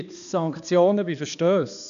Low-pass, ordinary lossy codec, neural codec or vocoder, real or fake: 7.2 kHz; none; codec, 16 kHz, 2 kbps, X-Codec, HuBERT features, trained on balanced general audio; fake